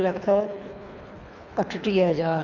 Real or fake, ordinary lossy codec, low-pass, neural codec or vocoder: fake; none; 7.2 kHz; codec, 24 kHz, 3 kbps, HILCodec